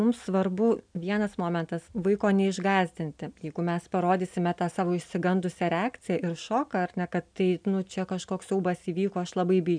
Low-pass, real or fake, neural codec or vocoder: 9.9 kHz; real; none